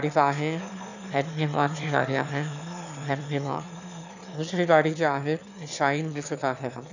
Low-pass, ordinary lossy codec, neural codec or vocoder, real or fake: 7.2 kHz; none; autoencoder, 22.05 kHz, a latent of 192 numbers a frame, VITS, trained on one speaker; fake